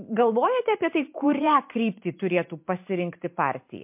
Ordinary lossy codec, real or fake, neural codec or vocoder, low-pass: MP3, 32 kbps; fake; vocoder, 44.1 kHz, 128 mel bands every 512 samples, BigVGAN v2; 3.6 kHz